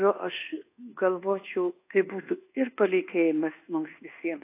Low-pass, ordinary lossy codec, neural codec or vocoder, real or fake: 3.6 kHz; AAC, 24 kbps; codec, 24 kHz, 1.2 kbps, DualCodec; fake